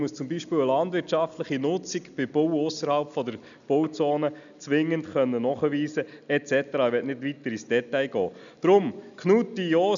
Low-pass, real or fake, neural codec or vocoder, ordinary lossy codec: 7.2 kHz; real; none; none